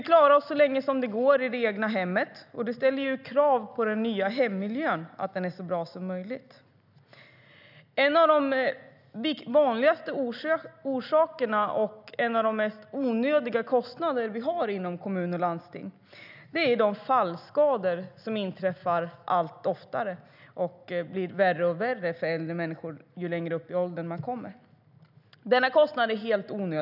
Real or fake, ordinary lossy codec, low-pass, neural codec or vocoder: real; none; 5.4 kHz; none